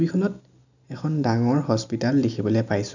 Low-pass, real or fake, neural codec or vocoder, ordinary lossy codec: 7.2 kHz; real; none; none